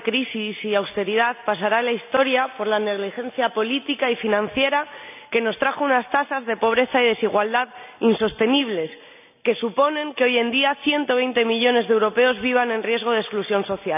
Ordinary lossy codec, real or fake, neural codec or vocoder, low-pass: none; real; none; 3.6 kHz